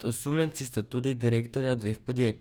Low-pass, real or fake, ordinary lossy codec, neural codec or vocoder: none; fake; none; codec, 44.1 kHz, 2.6 kbps, DAC